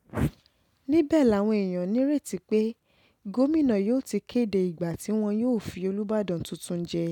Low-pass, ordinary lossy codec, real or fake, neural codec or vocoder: 19.8 kHz; none; real; none